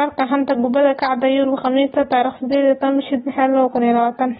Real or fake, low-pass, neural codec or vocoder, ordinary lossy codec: real; 19.8 kHz; none; AAC, 16 kbps